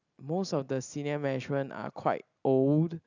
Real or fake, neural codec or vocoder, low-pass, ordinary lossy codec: real; none; 7.2 kHz; none